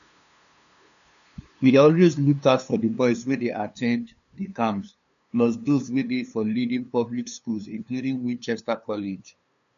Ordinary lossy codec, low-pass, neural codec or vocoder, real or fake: none; 7.2 kHz; codec, 16 kHz, 2 kbps, FunCodec, trained on LibriTTS, 25 frames a second; fake